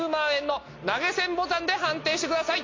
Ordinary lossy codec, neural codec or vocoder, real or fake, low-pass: AAC, 32 kbps; none; real; 7.2 kHz